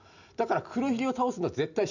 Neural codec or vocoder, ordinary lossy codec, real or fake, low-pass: none; none; real; 7.2 kHz